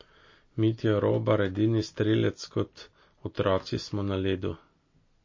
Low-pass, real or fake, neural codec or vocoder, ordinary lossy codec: 7.2 kHz; real; none; MP3, 32 kbps